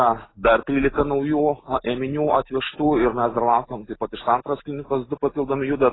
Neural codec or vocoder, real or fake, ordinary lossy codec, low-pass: none; real; AAC, 16 kbps; 7.2 kHz